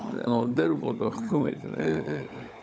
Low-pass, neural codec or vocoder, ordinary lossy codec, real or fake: none; codec, 16 kHz, 16 kbps, FunCodec, trained on LibriTTS, 50 frames a second; none; fake